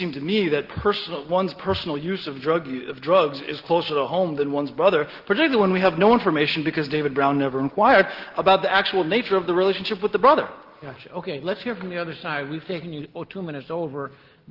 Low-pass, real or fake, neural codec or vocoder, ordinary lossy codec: 5.4 kHz; real; none; Opus, 16 kbps